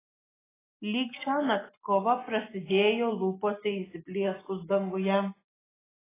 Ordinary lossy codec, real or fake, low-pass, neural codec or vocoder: AAC, 16 kbps; real; 3.6 kHz; none